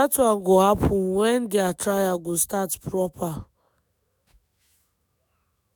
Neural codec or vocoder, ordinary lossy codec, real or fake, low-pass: autoencoder, 48 kHz, 128 numbers a frame, DAC-VAE, trained on Japanese speech; none; fake; none